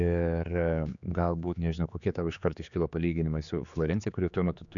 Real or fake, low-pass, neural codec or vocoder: fake; 7.2 kHz; codec, 16 kHz, 4 kbps, X-Codec, HuBERT features, trained on general audio